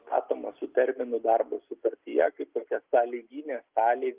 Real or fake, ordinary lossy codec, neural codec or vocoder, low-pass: real; Opus, 24 kbps; none; 3.6 kHz